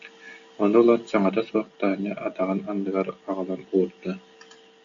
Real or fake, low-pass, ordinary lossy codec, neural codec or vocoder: real; 7.2 kHz; AAC, 64 kbps; none